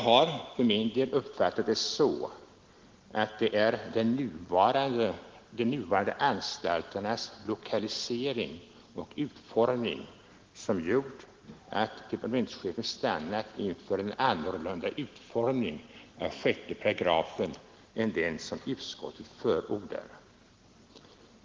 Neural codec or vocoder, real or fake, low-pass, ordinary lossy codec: none; real; 7.2 kHz; Opus, 16 kbps